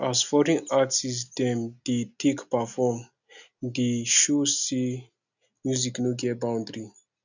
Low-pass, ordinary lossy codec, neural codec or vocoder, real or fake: 7.2 kHz; none; none; real